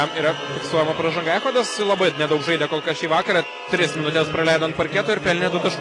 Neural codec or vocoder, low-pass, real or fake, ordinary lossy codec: none; 10.8 kHz; real; AAC, 32 kbps